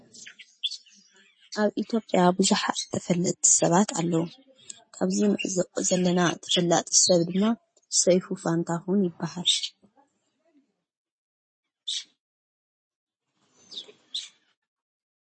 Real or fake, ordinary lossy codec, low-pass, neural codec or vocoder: real; MP3, 32 kbps; 9.9 kHz; none